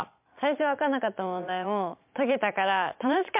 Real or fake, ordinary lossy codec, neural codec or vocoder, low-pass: fake; MP3, 32 kbps; vocoder, 22.05 kHz, 80 mel bands, Vocos; 3.6 kHz